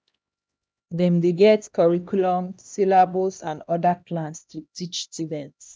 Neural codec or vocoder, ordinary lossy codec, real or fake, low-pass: codec, 16 kHz, 1 kbps, X-Codec, HuBERT features, trained on LibriSpeech; none; fake; none